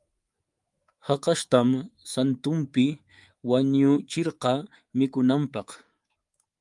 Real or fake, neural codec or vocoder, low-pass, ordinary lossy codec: fake; codec, 24 kHz, 3.1 kbps, DualCodec; 10.8 kHz; Opus, 32 kbps